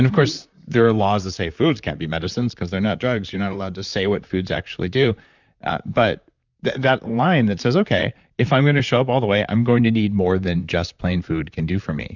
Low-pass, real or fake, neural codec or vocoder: 7.2 kHz; fake; vocoder, 44.1 kHz, 128 mel bands, Pupu-Vocoder